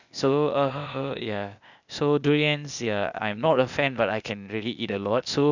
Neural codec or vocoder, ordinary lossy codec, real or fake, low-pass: codec, 16 kHz, 0.8 kbps, ZipCodec; none; fake; 7.2 kHz